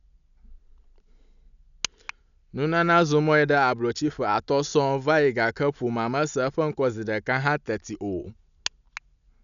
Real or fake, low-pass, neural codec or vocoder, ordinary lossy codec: real; 7.2 kHz; none; none